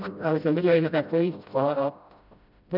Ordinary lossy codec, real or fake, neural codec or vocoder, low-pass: none; fake; codec, 16 kHz, 0.5 kbps, FreqCodec, smaller model; 5.4 kHz